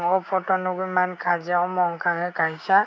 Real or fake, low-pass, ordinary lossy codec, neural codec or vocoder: fake; none; none; codec, 16 kHz, 6 kbps, DAC